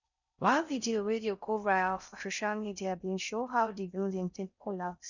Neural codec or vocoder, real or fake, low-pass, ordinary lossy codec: codec, 16 kHz in and 24 kHz out, 0.6 kbps, FocalCodec, streaming, 4096 codes; fake; 7.2 kHz; none